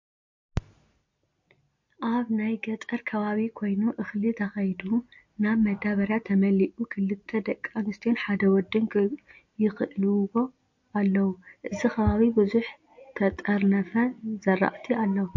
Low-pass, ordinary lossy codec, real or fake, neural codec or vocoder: 7.2 kHz; MP3, 48 kbps; real; none